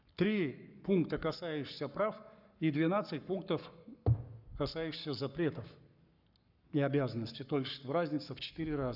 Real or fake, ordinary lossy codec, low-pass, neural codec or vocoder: fake; none; 5.4 kHz; codec, 44.1 kHz, 7.8 kbps, Pupu-Codec